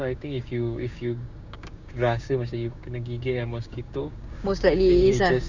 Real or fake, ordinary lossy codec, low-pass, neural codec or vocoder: fake; Opus, 64 kbps; 7.2 kHz; codec, 16 kHz, 6 kbps, DAC